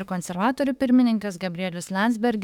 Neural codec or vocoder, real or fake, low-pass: autoencoder, 48 kHz, 32 numbers a frame, DAC-VAE, trained on Japanese speech; fake; 19.8 kHz